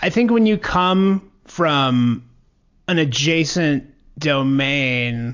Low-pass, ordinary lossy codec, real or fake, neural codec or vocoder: 7.2 kHz; AAC, 48 kbps; real; none